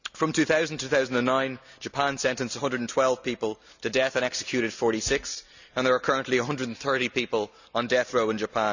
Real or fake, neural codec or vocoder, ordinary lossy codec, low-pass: real; none; none; 7.2 kHz